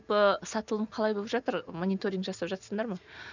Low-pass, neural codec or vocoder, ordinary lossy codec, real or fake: 7.2 kHz; none; none; real